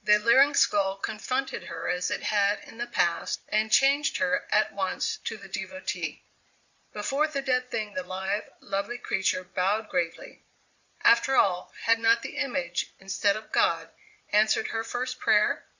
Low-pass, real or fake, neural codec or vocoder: 7.2 kHz; fake; vocoder, 22.05 kHz, 80 mel bands, Vocos